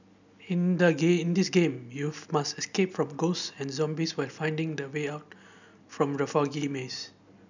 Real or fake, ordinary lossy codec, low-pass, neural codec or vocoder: real; none; 7.2 kHz; none